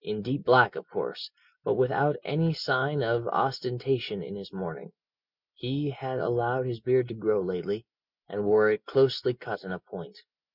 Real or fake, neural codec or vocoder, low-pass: real; none; 5.4 kHz